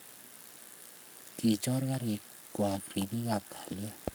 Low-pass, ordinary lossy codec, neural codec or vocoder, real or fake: none; none; codec, 44.1 kHz, 7.8 kbps, Pupu-Codec; fake